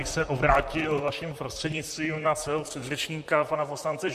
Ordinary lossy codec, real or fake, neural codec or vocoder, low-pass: MP3, 64 kbps; fake; vocoder, 44.1 kHz, 128 mel bands, Pupu-Vocoder; 14.4 kHz